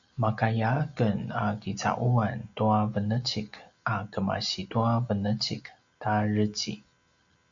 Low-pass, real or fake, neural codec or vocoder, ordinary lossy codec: 7.2 kHz; real; none; AAC, 64 kbps